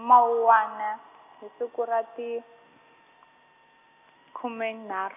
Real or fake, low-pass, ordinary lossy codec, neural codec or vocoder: real; 3.6 kHz; none; none